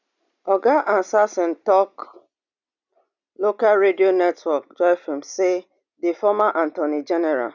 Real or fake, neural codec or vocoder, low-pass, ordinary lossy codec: real; none; 7.2 kHz; none